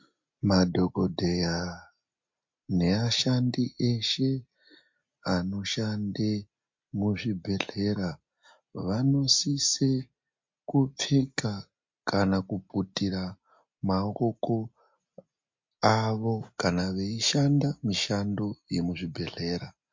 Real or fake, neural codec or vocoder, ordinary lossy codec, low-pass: real; none; MP3, 48 kbps; 7.2 kHz